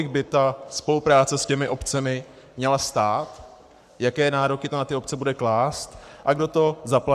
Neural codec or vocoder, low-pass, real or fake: codec, 44.1 kHz, 7.8 kbps, Pupu-Codec; 14.4 kHz; fake